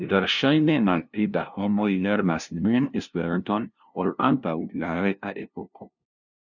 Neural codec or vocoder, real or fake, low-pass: codec, 16 kHz, 0.5 kbps, FunCodec, trained on LibriTTS, 25 frames a second; fake; 7.2 kHz